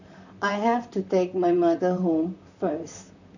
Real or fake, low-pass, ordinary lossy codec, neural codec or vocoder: fake; 7.2 kHz; none; vocoder, 44.1 kHz, 128 mel bands, Pupu-Vocoder